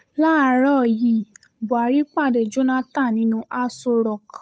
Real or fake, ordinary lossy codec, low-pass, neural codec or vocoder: fake; none; none; codec, 16 kHz, 8 kbps, FunCodec, trained on Chinese and English, 25 frames a second